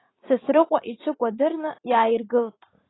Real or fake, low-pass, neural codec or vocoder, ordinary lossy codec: fake; 7.2 kHz; vocoder, 44.1 kHz, 80 mel bands, Vocos; AAC, 16 kbps